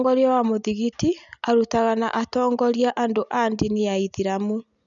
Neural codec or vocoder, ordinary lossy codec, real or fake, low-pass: none; none; real; 7.2 kHz